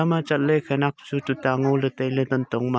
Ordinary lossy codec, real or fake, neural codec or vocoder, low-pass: none; real; none; none